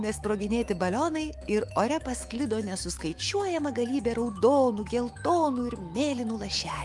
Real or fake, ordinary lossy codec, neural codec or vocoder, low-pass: fake; Opus, 32 kbps; autoencoder, 48 kHz, 128 numbers a frame, DAC-VAE, trained on Japanese speech; 10.8 kHz